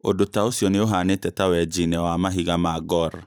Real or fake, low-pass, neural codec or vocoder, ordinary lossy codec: fake; none; vocoder, 44.1 kHz, 128 mel bands every 512 samples, BigVGAN v2; none